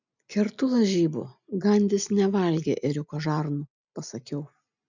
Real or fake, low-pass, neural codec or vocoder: real; 7.2 kHz; none